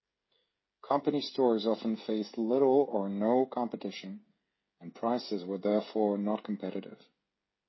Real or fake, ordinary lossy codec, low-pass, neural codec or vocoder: fake; MP3, 24 kbps; 7.2 kHz; codec, 16 kHz, 16 kbps, FreqCodec, smaller model